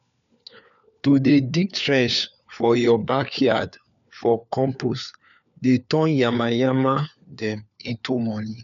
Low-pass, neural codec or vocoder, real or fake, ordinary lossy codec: 7.2 kHz; codec, 16 kHz, 4 kbps, FunCodec, trained on LibriTTS, 50 frames a second; fake; none